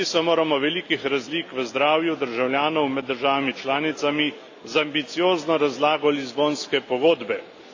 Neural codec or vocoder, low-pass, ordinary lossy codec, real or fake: none; 7.2 kHz; AAC, 48 kbps; real